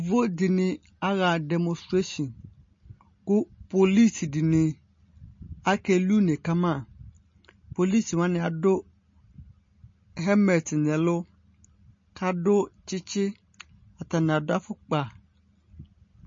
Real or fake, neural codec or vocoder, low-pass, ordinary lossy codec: real; none; 7.2 kHz; MP3, 32 kbps